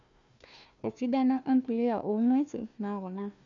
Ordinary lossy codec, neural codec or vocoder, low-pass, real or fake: none; codec, 16 kHz, 1 kbps, FunCodec, trained on Chinese and English, 50 frames a second; 7.2 kHz; fake